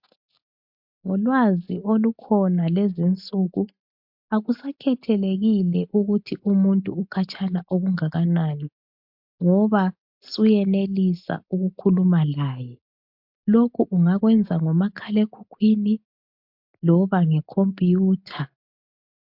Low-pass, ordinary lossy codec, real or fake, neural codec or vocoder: 5.4 kHz; MP3, 48 kbps; real; none